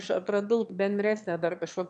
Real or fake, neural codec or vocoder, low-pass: fake; autoencoder, 22.05 kHz, a latent of 192 numbers a frame, VITS, trained on one speaker; 9.9 kHz